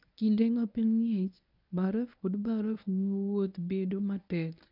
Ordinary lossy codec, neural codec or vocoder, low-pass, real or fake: none; codec, 24 kHz, 0.9 kbps, WavTokenizer, medium speech release version 1; 5.4 kHz; fake